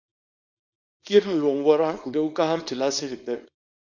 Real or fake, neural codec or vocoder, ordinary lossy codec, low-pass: fake; codec, 24 kHz, 0.9 kbps, WavTokenizer, small release; MP3, 48 kbps; 7.2 kHz